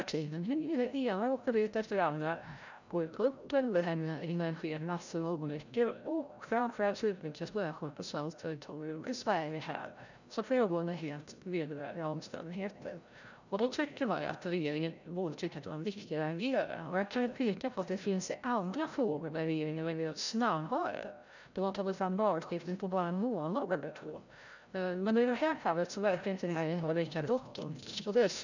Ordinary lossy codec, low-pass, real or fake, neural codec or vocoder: none; 7.2 kHz; fake; codec, 16 kHz, 0.5 kbps, FreqCodec, larger model